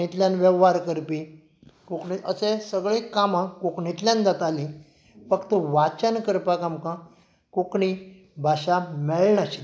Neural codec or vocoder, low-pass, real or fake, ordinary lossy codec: none; none; real; none